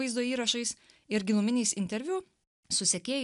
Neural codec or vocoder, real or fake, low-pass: none; real; 10.8 kHz